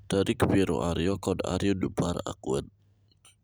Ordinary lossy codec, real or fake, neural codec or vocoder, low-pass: none; real; none; none